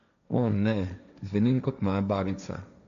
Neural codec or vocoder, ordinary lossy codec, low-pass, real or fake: codec, 16 kHz, 1.1 kbps, Voila-Tokenizer; none; 7.2 kHz; fake